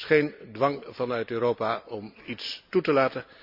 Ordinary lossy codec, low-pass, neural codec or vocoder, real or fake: none; 5.4 kHz; none; real